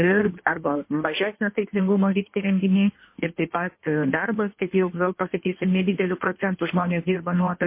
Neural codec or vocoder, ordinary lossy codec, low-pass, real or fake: codec, 16 kHz in and 24 kHz out, 1.1 kbps, FireRedTTS-2 codec; MP3, 24 kbps; 3.6 kHz; fake